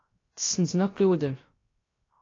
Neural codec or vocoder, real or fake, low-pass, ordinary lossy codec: codec, 16 kHz, 0.3 kbps, FocalCodec; fake; 7.2 kHz; AAC, 32 kbps